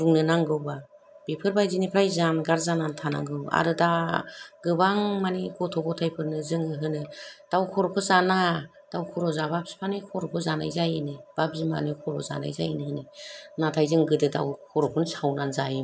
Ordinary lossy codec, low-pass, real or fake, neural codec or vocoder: none; none; real; none